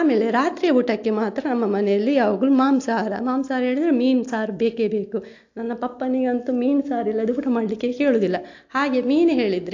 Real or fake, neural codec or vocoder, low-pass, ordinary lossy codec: real; none; 7.2 kHz; AAC, 48 kbps